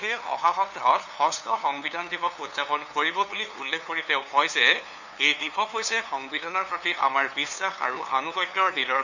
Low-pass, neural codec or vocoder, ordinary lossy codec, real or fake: 7.2 kHz; codec, 16 kHz, 2 kbps, FunCodec, trained on LibriTTS, 25 frames a second; none; fake